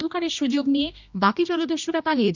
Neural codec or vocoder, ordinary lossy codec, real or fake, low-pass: codec, 16 kHz, 1 kbps, X-Codec, HuBERT features, trained on general audio; none; fake; 7.2 kHz